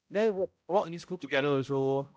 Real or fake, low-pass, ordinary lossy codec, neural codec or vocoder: fake; none; none; codec, 16 kHz, 0.5 kbps, X-Codec, HuBERT features, trained on balanced general audio